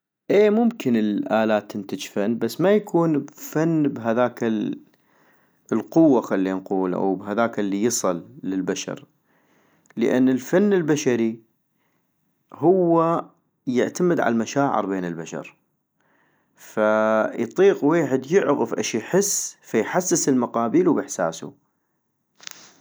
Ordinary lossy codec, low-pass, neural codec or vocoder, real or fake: none; none; none; real